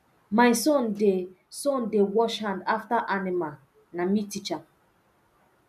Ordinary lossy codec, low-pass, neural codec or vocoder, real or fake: none; 14.4 kHz; none; real